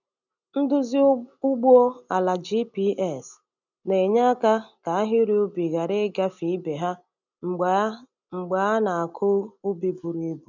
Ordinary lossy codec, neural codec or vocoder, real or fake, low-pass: none; none; real; 7.2 kHz